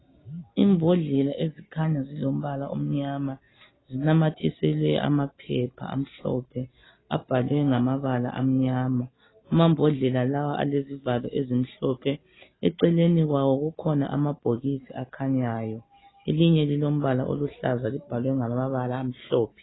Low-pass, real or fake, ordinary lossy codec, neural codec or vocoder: 7.2 kHz; real; AAC, 16 kbps; none